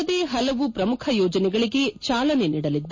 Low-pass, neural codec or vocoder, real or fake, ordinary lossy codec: 7.2 kHz; none; real; MP3, 32 kbps